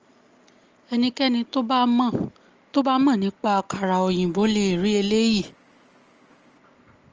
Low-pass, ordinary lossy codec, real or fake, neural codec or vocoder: 7.2 kHz; Opus, 24 kbps; real; none